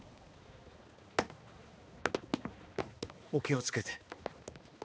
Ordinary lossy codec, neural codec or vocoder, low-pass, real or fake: none; codec, 16 kHz, 2 kbps, X-Codec, HuBERT features, trained on balanced general audio; none; fake